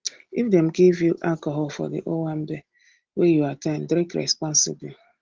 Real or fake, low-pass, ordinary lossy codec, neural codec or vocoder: real; 7.2 kHz; Opus, 16 kbps; none